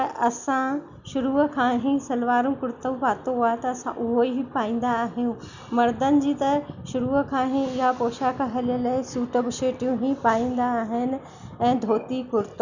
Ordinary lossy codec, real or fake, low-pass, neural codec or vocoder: none; real; 7.2 kHz; none